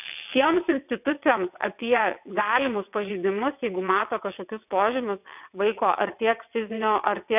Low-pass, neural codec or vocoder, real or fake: 3.6 kHz; vocoder, 22.05 kHz, 80 mel bands, WaveNeXt; fake